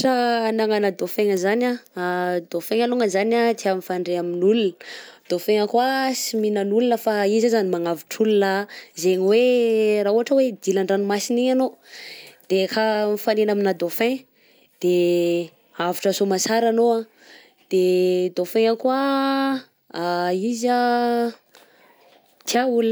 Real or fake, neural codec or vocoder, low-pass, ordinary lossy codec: real; none; none; none